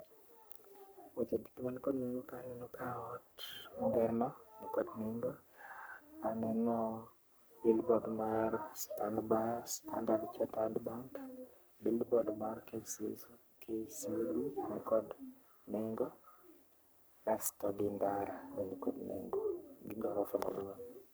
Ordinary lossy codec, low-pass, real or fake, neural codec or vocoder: none; none; fake; codec, 44.1 kHz, 3.4 kbps, Pupu-Codec